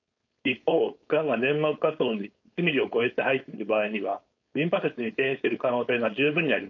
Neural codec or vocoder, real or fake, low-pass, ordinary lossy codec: codec, 16 kHz, 4.8 kbps, FACodec; fake; 7.2 kHz; none